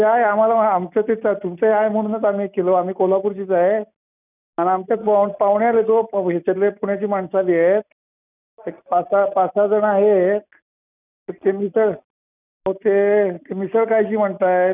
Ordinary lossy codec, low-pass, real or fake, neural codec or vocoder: none; 3.6 kHz; real; none